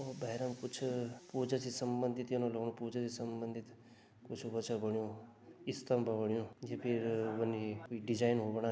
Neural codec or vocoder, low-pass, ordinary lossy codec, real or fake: none; none; none; real